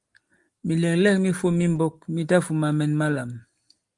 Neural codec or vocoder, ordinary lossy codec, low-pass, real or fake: none; Opus, 32 kbps; 10.8 kHz; real